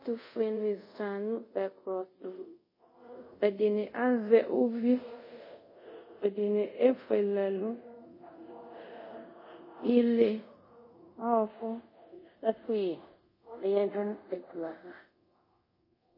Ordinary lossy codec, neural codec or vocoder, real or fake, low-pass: MP3, 24 kbps; codec, 24 kHz, 0.5 kbps, DualCodec; fake; 5.4 kHz